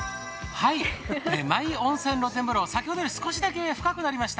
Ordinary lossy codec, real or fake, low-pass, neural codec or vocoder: none; real; none; none